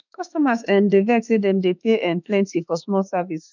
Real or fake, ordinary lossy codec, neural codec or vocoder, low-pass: fake; none; autoencoder, 48 kHz, 32 numbers a frame, DAC-VAE, trained on Japanese speech; 7.2 kHz